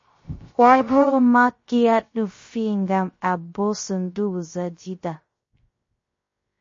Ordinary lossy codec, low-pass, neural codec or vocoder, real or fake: MP3, 32 kbps; 7.2 kHz; codec, 16 kHz, 0.3 kbps, FocalCodec; fake